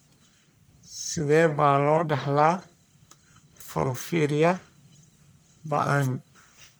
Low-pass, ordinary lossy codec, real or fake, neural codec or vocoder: none; none; fake; codec, 44.1 kHz, 1.7 kbps, Pupu-Codec